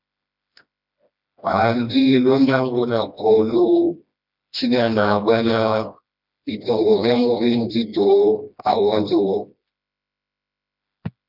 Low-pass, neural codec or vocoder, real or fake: 5.4 kHz; codec, 16 kHz, 1 kbps, FreqCodec, smaller model; fake